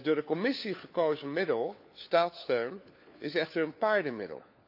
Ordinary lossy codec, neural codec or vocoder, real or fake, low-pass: AAC, 48 kbps; codec, 16 kHz, 4 kbps, FunCodec, trained on LibriTTS, 50 frames a second; fake; 5.4 kHz